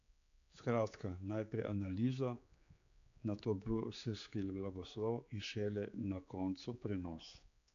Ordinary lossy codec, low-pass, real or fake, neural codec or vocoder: MP3, 64 kbps; 7.2 kHz; fake; codec, 16 kHz, 4 kbps, X-Codec, HuBERT features, trained on general audio